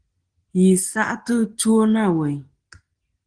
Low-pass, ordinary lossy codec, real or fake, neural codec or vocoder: 9.9 kHz; Opus, 16 kbps; real; none